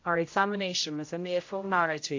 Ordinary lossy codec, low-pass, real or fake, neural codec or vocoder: AAC, 48 kbps; 7.2 kHz; fake; codec, 16 kHz, 0.5 kbps, X-Codec, HuBERT features, trained on general audio